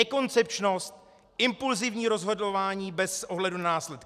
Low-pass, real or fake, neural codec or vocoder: 14.4 kHz; real; none